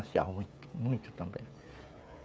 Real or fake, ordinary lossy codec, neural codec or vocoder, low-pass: fake; none; codec, 16 kHz, 16 kbps, FreqCodec, smaller model; none